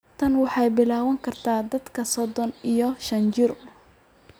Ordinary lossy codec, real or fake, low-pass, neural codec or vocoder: none; real; none; none